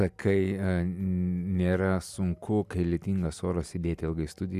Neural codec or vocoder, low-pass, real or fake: none; 14.4 kHz; real